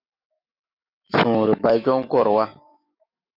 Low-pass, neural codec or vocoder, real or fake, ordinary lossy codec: 5.4 kHz; autoencoder, 48 kHz, 128 numbers a frame, DAC-VAE, trained on Japanese speech; fake; Opus, 64 kbps